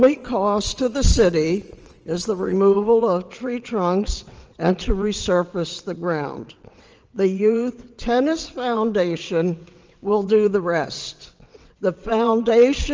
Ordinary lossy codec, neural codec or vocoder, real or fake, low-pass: Opus, 24 kbps; vocoder, 22.05 kHz, 80 mel bands, WaveNeXt; fake; 7.2 kHz